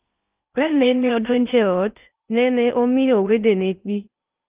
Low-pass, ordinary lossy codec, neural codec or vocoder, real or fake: 3.6 kHz; Opus, 24 kbps; codec, 16 kHz in and 24 kHz out, 0.6 kbps, FocalCodec, streaming, 4096 codes; fake